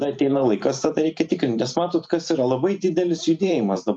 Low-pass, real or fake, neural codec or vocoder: 9.9 kHz; fake; vocoder, 44.1 kHz, 128 mel bands every 512 samples, BigVGAN v2